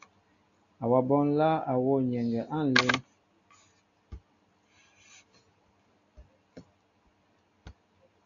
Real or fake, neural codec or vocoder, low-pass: real; none; 7.2 kHz